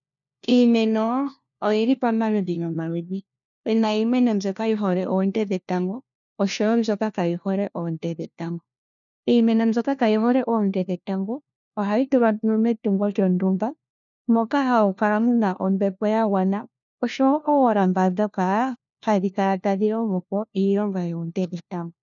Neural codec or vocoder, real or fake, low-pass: codec, 16 kHz, 1 kbps, FunCodec, trained on LibriTTS, 50 frames a second; fake; 7.2 kHz